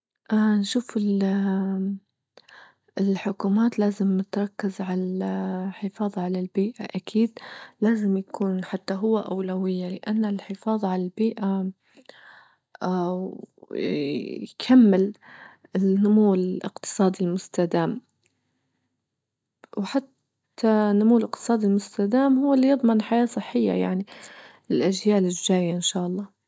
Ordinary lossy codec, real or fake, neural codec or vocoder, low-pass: none; real; none; none